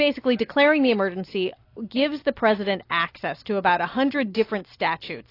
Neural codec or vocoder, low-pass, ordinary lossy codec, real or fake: none; 5.4 kHz; AAC, 32 kbps; real